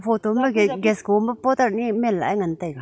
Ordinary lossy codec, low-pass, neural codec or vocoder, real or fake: none; none; none; real